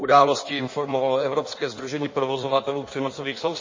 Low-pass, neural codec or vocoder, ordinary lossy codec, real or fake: 7.2 kHz; codec, 16 kHz in and 24 kHz out, 1.1 kbps, FireRedTTS-2 codec; MP3, 32 kbps; fake